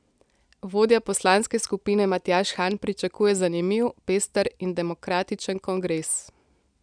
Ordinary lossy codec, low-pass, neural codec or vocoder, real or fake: none; 9.9 kHz; none; real